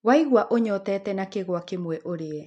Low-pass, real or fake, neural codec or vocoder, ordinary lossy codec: 10.8 kHz; real; none; MP3, 64 kbps